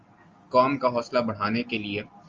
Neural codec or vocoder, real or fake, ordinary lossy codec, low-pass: none; real; Opus, 32 kbps; 7.2 kHz